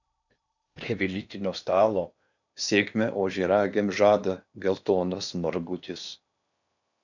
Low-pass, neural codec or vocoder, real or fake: 7.2 kHz; codec, 16 kHz in and 24 kHz out, 0.8 kbps, FocalCodec, streaming, 65536 codes; fake